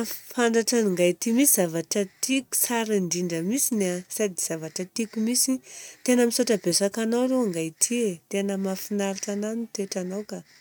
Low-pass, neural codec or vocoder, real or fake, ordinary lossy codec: none; none; real; none